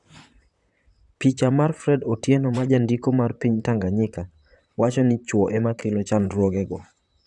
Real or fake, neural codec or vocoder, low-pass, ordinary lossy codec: fake; vocoder, 44.1 kHz, 128 mel bands, Pupu-Vocoder; 10.8 kHz; none